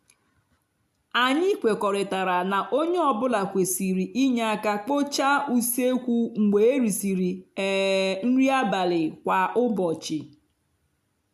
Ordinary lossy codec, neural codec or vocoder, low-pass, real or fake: AAC, 96 kbps; none; 14.4 kHz; real